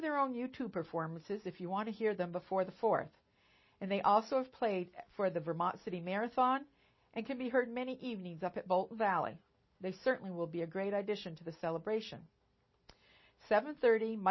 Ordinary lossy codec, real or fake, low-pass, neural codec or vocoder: MP3, 24 kbps; real; 7.2 kHz; none